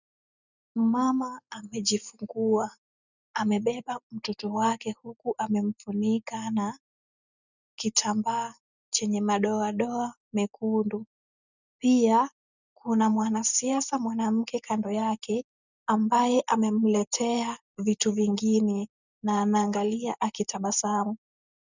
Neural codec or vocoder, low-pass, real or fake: none; 7.2 kHz; real